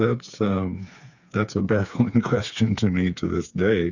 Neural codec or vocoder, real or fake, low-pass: codec, 16 kHz, 4 kbps, FreqCodec, smaller model; fake; 7.2 kHz